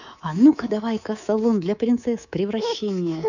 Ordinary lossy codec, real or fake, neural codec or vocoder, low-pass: none; fake; codec, 24 kHz, 3.1 kbps, DualCodec; 7.2 kHz